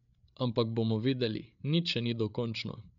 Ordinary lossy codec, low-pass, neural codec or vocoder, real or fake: none; 7.2 kHz; codec, 16 kHz, 8 kbps, FreqCodec, larger model; fake